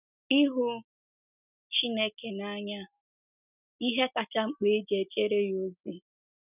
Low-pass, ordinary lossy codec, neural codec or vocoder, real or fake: 3.6 kHz; none; none; real